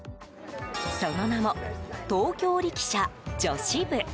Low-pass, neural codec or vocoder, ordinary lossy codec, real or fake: none; none; none; real